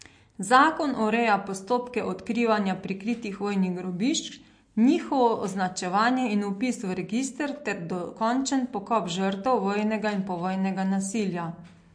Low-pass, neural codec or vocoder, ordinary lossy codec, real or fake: 9.9 kHz; none; MP3, 48 kbps; real